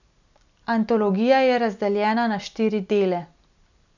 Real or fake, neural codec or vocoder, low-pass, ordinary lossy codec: real; none; 7.2 kHz; none